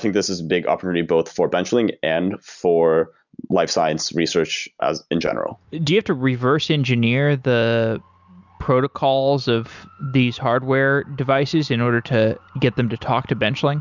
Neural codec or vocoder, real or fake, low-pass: none; real; 7.2 kHz